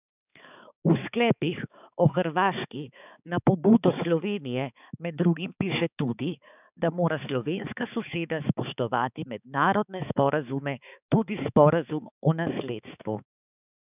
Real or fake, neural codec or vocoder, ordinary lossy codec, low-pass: fake; codec, 16 kHz, 4 kbps, X-Codec, HuBERT features, trained on balanced general audio; none; 3.6 kHz